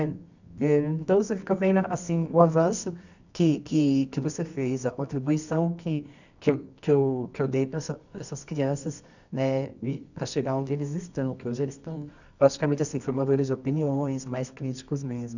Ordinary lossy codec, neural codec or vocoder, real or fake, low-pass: none; codec, 24 kHz, 0.9 kbps, WavTokenizer, medium music audio release; fake; 7.2 kHz